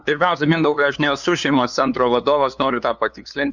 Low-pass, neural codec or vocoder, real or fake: 7.2 kHz; codec, 16 kHz, 2 kbps, FunCodec, trained on LibriTTS, 25 frames a second; fake